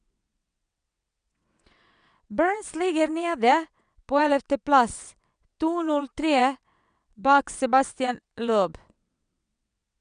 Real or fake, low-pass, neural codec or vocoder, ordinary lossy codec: fake; 9.9 kHz; vocoder, 22.05 kHz, 80 mel bands, WaveNeXt; none